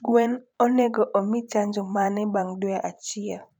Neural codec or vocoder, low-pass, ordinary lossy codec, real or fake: vocoder, 44.1 kHz, 128 mel bands every 512 samples, BigVGAN v2; 19.8 kHz; none; fake